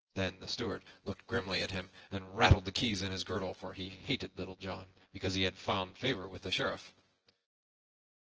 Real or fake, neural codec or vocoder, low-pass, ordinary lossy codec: fake; vocoder, 24 kHz, 100 mel bands, Vocos; 7.2 kHz; Opus, 16 kbps